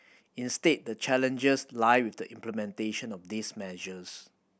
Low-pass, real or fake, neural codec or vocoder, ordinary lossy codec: none; real; none; none